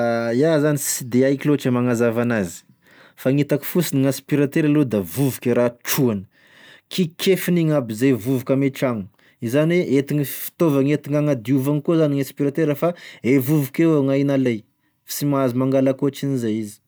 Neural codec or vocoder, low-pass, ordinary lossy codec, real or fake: none; none; none; real